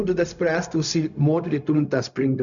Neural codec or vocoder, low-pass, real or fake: codec, 16 kHz, 0.4 kbps, LongCat-Audio-Codec; 7.2 kHz; fake